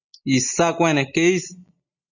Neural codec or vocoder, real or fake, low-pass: none; real; 7.2 kHz